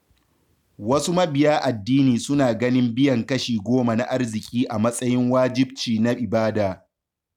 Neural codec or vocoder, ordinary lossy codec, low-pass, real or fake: none; none; 19.8 kHz; real